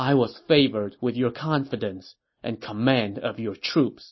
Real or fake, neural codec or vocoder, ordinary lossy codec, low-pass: real; none; MP3, 24 kbps; 7.2 kHz